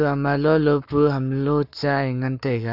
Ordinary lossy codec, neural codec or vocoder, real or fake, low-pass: none; codec, 44.1 kHz, 7.8 kbps, Pupu-Codec; fake; 5.4 kHz